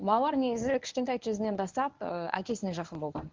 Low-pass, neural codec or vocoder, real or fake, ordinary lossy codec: 7.2 kHz; codec, 24 kHz, 0.9 kbps, WavTokenizer, medium speech release version 1; fake; Opus, 32 kbps